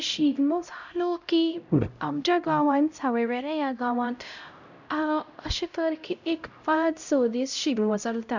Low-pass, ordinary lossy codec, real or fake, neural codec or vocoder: 7.2 kHz; none; fake; codec, 16 kHz, 0.5 kbps, X-Codec, HuBERT features, trained on LibriSpeech